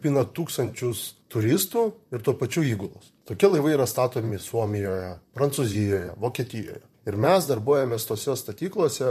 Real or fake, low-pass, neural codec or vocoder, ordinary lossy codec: fake; 14.4 kHz; vocoder, 44.1 kHz, 128 mel bands, Pupu-Vocoder; MP3, 64 kbps